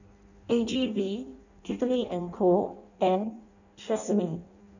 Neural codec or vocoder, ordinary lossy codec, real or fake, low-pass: codec, 16 kHz in and 24 kHz out, 0.6 kbps, FireRedTTS-2 codec; none; fake; 7.2 kHz